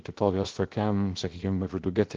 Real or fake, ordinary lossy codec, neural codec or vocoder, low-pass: fake; Opus, 16 kbps; codec, 16 kHz, 0.3 kbps, FocalCodec; 7.2 kHz